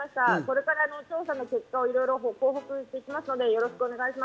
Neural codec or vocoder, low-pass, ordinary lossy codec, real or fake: none; none; none; real